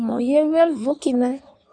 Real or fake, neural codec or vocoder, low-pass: fake; codec, 16 kHz in and 24 kHz out, 1.1 kbps, FireRedTTS-2 codec; 9.9 kHz